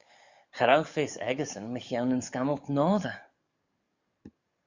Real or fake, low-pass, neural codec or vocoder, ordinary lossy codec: fake; 7.2 kHz; codec, 44.1 kHz, 7.8 kbps, DAC; Opus, 64 kbps